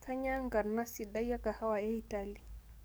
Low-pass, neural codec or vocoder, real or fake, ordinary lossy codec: none; codec, 44.1 kHz, 7.8 kbps, DAC; fake; none